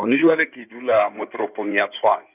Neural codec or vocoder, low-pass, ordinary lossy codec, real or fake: codec, 16 kHz in and 24 kHz out, 2.2 kbps, FireRedTTS-2 codec; 3.6 kHz; none; fake